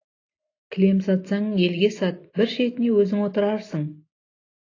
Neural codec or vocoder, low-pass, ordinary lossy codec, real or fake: none; 7.2 kHz; AAC, 32 kbps; real